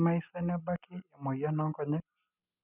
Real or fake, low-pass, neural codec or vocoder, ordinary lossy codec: real; 3.6 kHz; none; none